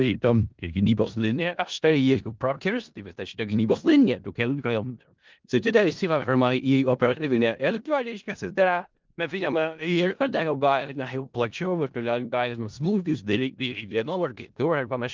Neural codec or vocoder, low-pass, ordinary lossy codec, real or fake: codec, 16 kHz in and 24 kHz out, 0.4 kbps, LongCat-Audio-Codec, four codebook decoder; 7.2 kHz; Opus, 32 kbps; fake